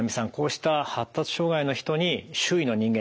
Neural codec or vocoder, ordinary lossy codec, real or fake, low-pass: none; none; real; none